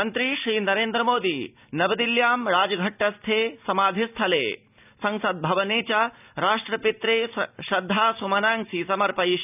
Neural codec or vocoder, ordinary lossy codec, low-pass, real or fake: none; none; 3.6 kHz; real